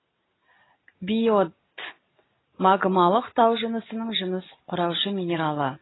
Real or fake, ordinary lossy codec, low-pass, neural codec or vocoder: real; AAC, 16 kbps; 7.2 kHz; none